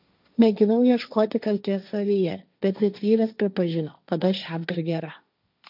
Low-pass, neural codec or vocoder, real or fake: 5.4 kHz; codec, 16 kHz, 1.1 kbps, Voila-Tokenizer; fake